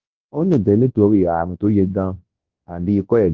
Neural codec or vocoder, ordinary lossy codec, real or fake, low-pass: codec, 24 kHz, 0.9 kbps, WavTokenizer, large speech release; Opus, 16 kbps; fake; 7.2 kHz